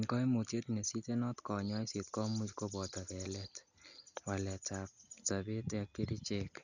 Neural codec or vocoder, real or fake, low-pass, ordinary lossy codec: none; real; 7.2 kHz; none